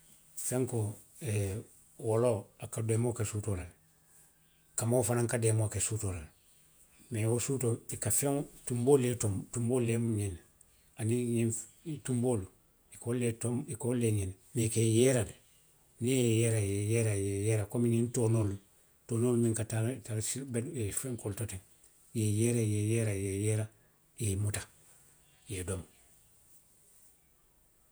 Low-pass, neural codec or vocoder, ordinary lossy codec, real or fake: none; vocoder, 48 kHz, 128 mel bands, Vocos; none; fake